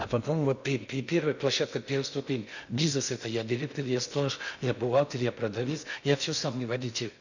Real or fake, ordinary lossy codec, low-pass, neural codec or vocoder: fake; none; 7.2 kHz; codec, 16 kHz in and 24 kHz out, 0.6 kbps, FocalCodec, streaming, 2048 codes